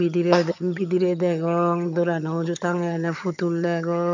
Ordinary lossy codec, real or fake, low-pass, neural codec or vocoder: none; fake; 7.2 kHz; vocoder, 22.05 kHz, 80 mel bands, HiFi-GAN